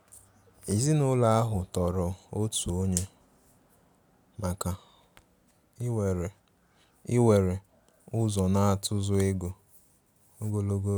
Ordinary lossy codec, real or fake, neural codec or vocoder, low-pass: none; real; none; none